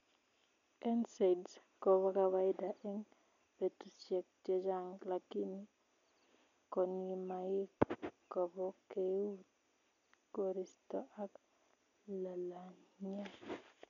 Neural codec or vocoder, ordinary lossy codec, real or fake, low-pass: none; none; real; 7.2 kHz